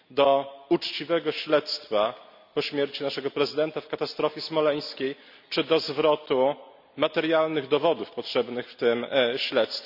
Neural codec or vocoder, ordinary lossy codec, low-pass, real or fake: none; none; 5.4 kHz; real